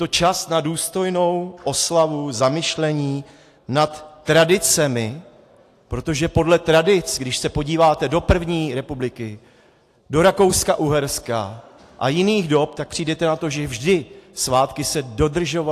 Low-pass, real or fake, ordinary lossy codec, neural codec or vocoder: 14.4 kHz; real; AAC, 64 kbps; none